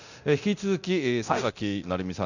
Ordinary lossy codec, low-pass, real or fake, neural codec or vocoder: none; 7.2 kHz; fake; codec, 24 kHz, 0.9 kbps, DualCodec